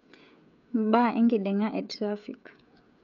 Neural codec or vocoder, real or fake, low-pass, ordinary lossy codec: codec, 16 kHz, 16 kbps, FreqCodec, smaller model; fake; 7.2 kHz; none